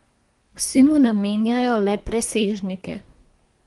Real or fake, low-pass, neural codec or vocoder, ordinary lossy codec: fake; 10.8 kHz; codec, 24 kHz, 1 kbps, SNAC; Opus, 24 kbps